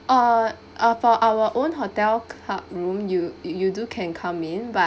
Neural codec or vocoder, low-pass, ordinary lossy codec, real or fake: none; none; none; real